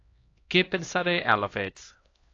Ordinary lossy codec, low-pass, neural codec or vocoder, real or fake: AAC, 32 kbps; 7.2 kHz; codec, 16 kHz, 1 kbps, X-Codec, HuBERT features, trained on LibriSpeech; fake